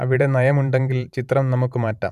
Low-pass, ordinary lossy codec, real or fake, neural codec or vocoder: 14.4 kHz; none; fake; vocoder, 44.1 kHz, 128 mel bands every 512 samples, BigVGAN v2